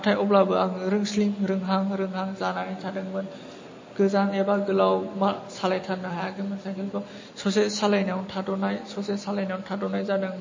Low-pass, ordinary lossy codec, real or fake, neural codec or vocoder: 7.2 kHz; MP3, 32 kbps; real; none